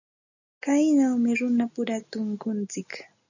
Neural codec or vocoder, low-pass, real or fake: none; 7.2 kHz; real